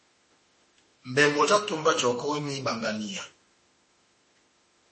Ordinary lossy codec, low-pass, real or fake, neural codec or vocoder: MP3, 32 kbps; 10.8 kHz; fake; autoencoder, 48 kHz, 32 numbers a frame, DAC-VAE, trained on Japanese speech